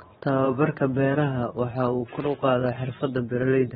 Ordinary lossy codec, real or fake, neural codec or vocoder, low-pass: AAC, 16 kbps; fake; vocoder, 22.05 kHz, 80 mel bands, Vocos; 9.9 kHz